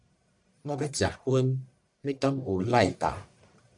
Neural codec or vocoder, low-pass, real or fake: codec, 44.1 kHz, 1.7 kbps, Pupu-Codec; 10.8 kHz; fake